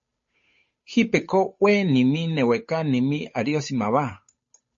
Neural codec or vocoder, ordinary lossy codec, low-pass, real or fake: codec, 16 kHz, 8 kbps, FunCodec, trained on Chinese and English, 25 frames a second; MP3, 32 kbps; 7.2 kHz; fake